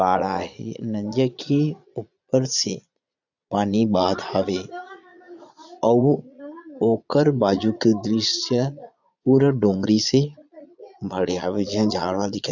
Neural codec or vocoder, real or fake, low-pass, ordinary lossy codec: vocoder, 22.05 kHz, 80 mel bands, Vocos; fake; 7.2 kHz; none